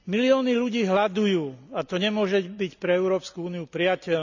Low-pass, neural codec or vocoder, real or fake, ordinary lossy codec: 7.2 kHz; none; real; none